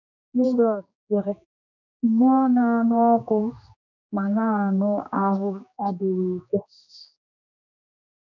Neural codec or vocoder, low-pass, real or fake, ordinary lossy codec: codec, 16 kHz, 2 kbps, X-Codec, HuBERT features, trained on general audio; 7.2 kHz; fake; none